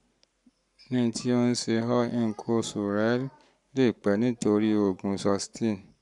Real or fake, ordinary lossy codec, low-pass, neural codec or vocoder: fake; none; 10.8 kHz; codec, 44.1 kHz, 7.8 kbps, Pupu-Codec